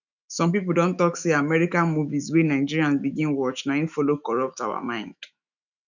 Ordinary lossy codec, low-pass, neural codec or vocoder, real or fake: none; 7.2 kHz; autoencoder, 48 kHz, 128 numbers a frame, DAC-VAE, trained on Japanese speech; fake